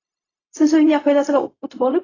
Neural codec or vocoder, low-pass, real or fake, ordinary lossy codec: codec, 16 kHz, 0.4 kbps, LongCat-Audio-Codec; 7.2 kHz; fake; AAC, 32 kbps